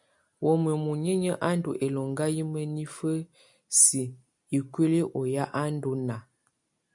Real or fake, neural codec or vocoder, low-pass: real; none; 10.8 kHz